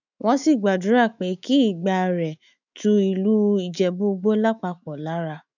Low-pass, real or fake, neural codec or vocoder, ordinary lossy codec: 7.2 kHz; fake; autoencoder, 48 kHz, 128 numbers a frame, DAC-VAE, trained on Japanese speech; none